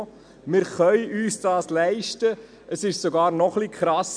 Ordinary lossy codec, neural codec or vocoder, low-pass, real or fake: none; none; 9.9 kHz; real